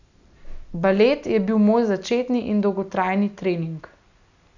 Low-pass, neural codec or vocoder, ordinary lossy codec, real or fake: 7.2 kHz; none; none; real